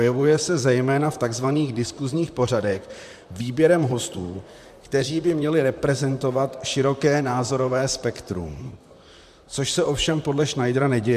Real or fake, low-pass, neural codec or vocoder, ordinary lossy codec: fake; 14.4 kHz; vocoder, 44.1 kHz, 128 mel bands, Pupu-Vocoder; MP3, 96 kbps